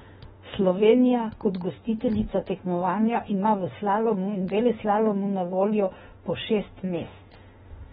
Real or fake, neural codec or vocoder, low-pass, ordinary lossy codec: fake; autoencoder, 48 kHz, 32 numbers a frame, DAC-VAE, trained on Japanese speech; 19.8 kHz; AAC, 16 kbps